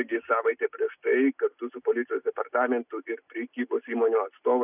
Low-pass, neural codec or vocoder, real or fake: 3.6 kHz; none; real